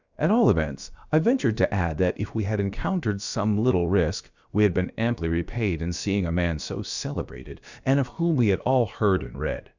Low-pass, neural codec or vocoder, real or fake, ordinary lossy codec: 7.2 kHz; codec, 16 kHz, about 1 kbps, DyCAST, with the encoder's durations; fake; Opus, 64 kbps